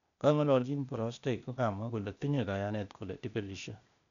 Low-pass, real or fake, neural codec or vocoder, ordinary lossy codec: 7.2 kHz; fake; codec, 16 kHz, 0.8 kbps, ZipCodec; none